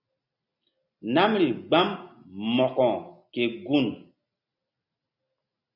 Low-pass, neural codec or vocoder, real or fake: 5.4 kHz; none; real